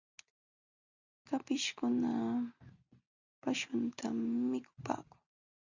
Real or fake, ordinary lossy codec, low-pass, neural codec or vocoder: real; Opus, 64 kbps; 7.2 kHz; none